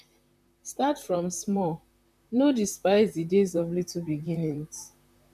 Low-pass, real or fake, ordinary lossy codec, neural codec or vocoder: 14.4 kHz; fake; none; vocoder, 44.1 kHz, 128 mel bands, Pupu-Vocoder